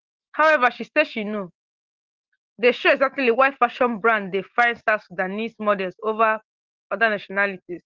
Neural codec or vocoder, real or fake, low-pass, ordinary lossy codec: none; real; 7.2 kHz; Opus, 16 kbps